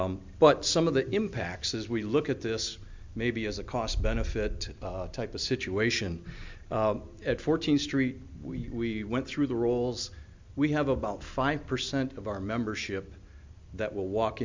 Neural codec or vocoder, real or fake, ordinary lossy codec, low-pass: none; real; MP3, 64 kbps; 7.2 kHz